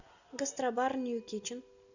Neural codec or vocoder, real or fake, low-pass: none; real; 7.2 kHz